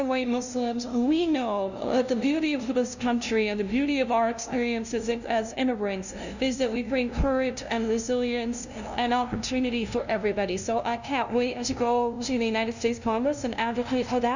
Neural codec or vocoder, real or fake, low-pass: codec, 16 kHz, 0.5 kbps, FunCodec, trained on LibriTTS, 25 frames a second; fake; 7.2 kHz